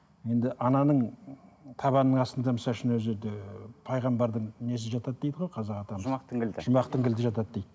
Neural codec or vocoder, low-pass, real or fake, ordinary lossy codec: none; none; real; none